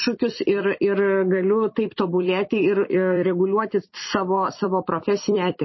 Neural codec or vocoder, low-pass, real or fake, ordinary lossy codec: vocoder, 44.1 kHz, 128 mel bands every 256 samples, BigVGAN v2; 7.2 kHz; fake; MP3, 24 kbps